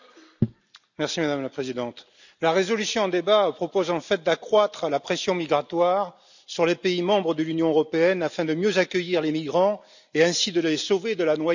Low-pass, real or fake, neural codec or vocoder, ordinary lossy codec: 7.2 kHz; real; none; none